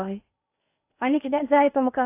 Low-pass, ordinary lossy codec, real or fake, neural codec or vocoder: 3.6 kHz; AAC, 24 kbps; fake; codec, 16 kHz in and 24 kHz out, 0.8 kbps, FocalCodec, streaming, 65536 codes